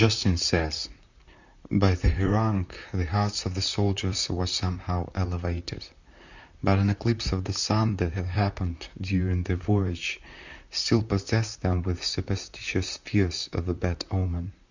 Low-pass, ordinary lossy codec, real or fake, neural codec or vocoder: 7.2 kHz; Opus, 64 kbps; fake; vocoder, 44.1 kHz, 128 mel bands, Pupu-Vocoder